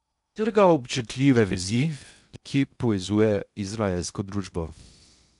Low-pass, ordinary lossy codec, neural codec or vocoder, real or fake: 10.8 kHz; none; codec, 16 kHz in and 24 kHz out, 0.6 kbps, FocalCodec, streaming, 2048 codes; fake